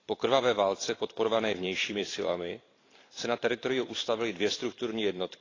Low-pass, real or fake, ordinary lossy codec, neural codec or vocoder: 7.2 kHz; fake; AAC, 32 kbps; vocoder, 44.1 kHz, 128 mel bands every 512 samples, BigVGAN v2